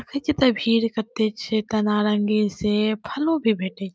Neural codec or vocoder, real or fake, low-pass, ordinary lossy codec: none; real; none; none